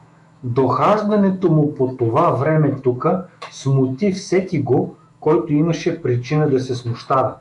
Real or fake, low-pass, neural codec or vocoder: fake; 10.8 kHz; autoencoder, 48 kHz, 128 numbers a frame, DAC-VAE, trained on Japanese speech